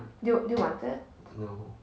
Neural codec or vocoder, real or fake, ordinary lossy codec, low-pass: none; real; none; none